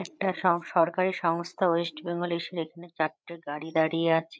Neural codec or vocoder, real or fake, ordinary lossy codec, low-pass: codec, 16 kHz, 8 kbps, FreqCodec, larger model; fake; none; none